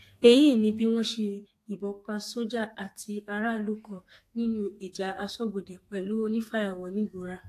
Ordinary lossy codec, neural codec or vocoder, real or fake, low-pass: AAC, 64 kbps; codec, 32 kHz, 1.9 kbps, SNAC; fake; 14.4 kHz